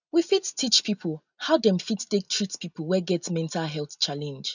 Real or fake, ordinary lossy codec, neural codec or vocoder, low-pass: real; none; none; 7.2 kHz